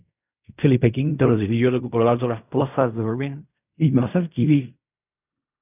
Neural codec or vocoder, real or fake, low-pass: codec, 16 kHz in and 24 kHz out, 0.4 kbps, LongCat-Audio-Codec, fine tuned four codebook decoder; fake; 3.6 kHz